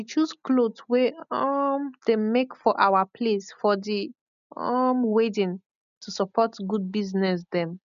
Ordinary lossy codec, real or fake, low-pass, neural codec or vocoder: none; real; 7.2 kHz; none